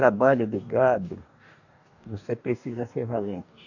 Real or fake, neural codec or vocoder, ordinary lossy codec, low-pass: fake; codec, 44.1 kHz, 2.6 kbps, DAC; none; 7.2 kHz